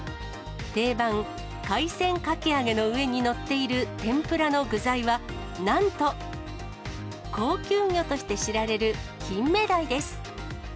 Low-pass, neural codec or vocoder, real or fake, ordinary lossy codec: none; none; real; none